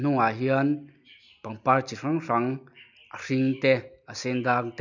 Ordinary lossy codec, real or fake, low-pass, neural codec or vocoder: none; real; 7.2 kHz; none